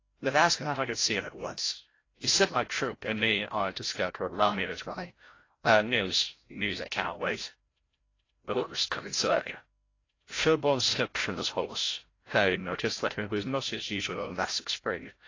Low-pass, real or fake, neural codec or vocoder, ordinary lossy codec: 7.2 kHz; fake; codec, 16 kHz, 0.5 kbps, FreqCodec, larger model; AAC, 32 kbps